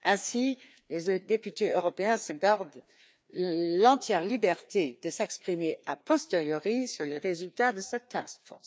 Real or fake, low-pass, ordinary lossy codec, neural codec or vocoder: fake; none; none; codec, 16 kHz, 2 kbps, FreqCodec, larger model